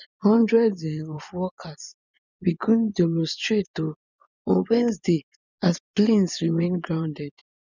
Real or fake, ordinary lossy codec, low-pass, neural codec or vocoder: fake; none; 7.2 kHz; vocoder, 24 kHz, 100 mel bands, Vocos